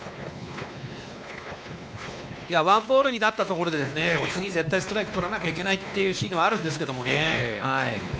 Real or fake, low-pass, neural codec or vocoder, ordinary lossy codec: fake; none; codec, 16 kHz, 2 kbps, X-Codec, WavLM features, trained on Multilingual LibriSpeech; none